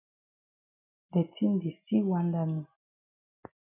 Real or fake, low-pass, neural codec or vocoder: real; 3.6 kHz; none